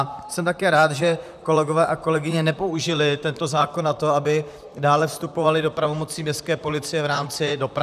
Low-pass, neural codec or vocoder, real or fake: 14.4 kHz; vocoder, 44.1 kHz, 128 mel bands, Pupu-Vocoder; fake